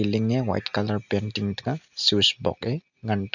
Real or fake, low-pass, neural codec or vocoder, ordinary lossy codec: real; 7.2 kHz; none; none